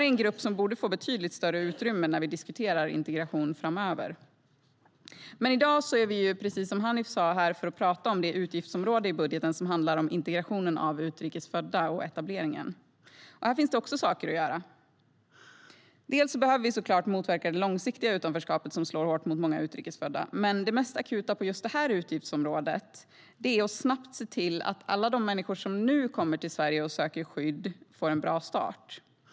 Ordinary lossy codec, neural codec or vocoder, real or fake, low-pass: none; none; real; none